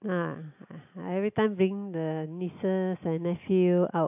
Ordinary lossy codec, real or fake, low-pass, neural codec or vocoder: AAC, 32 kbps; real; 3.6 kHz; none